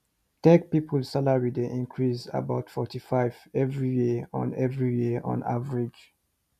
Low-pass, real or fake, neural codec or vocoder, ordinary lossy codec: 14.4 kHz; real; none; none